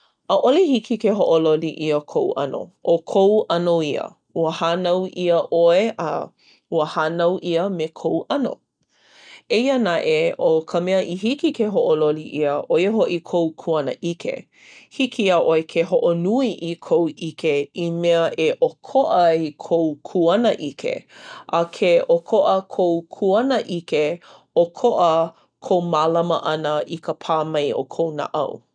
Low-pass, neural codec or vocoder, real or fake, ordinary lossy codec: 9.9 kHz; none; real; none